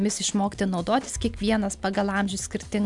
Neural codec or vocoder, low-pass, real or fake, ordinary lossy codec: none; 10.8 kHz; real; MP3, 96 kbps